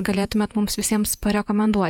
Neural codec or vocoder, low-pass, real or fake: vocoder, 48 kHz, 128 mel bands, Vocos; 19.8 kHz; fake